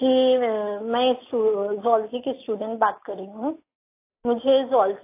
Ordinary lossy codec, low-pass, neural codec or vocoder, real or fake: AAC, 24 kbps; 3.6 kHz; none; real